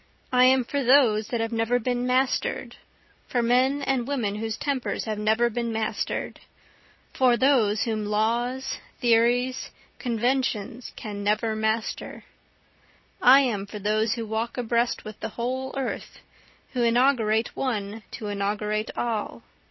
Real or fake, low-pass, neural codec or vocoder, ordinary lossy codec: real; 7.2 kHz; none; MP3, 24 kbps